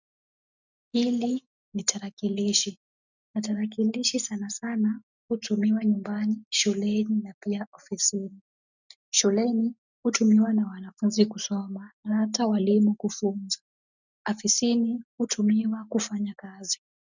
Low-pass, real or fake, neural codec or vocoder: 7.2 kHz; real; none